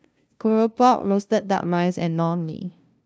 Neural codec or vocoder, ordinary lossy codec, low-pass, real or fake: codec, 16 kHz, 0.5 kbps, FunCodec, trained on LibriTTS, 25 frames a second; none; none; fake